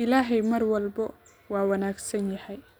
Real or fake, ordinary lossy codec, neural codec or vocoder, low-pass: real; none; none; none